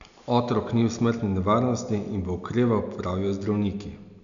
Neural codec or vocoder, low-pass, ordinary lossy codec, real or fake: none; 7.2 kHz; none; real